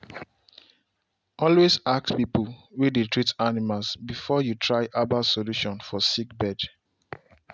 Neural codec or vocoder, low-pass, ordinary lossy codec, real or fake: none; none; none; real